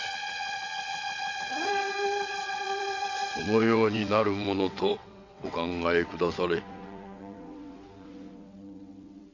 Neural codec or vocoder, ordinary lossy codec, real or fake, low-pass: vocoder, 22.05 kHz, 80 mel bands, WaveNeXt; MP3, 64 kbps; fake; 7.2 kHz